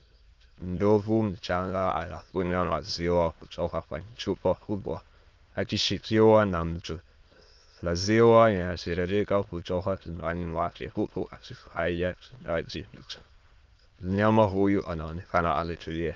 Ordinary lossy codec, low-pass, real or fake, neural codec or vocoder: Opus, 24 kbps; 7.2 kHz; fake; autoencoder, 22.05 kHz, a latent of 192 numbers a frame, VITS, trained on many speakers